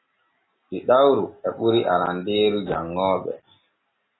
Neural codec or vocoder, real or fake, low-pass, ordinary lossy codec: none; real; 7.2 kHz; AAC, 16 kbps